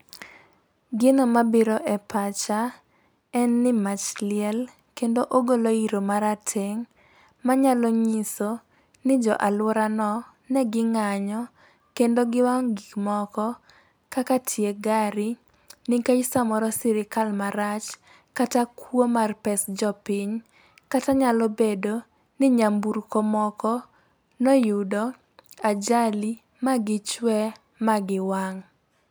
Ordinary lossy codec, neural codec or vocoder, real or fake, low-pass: none; none; real; none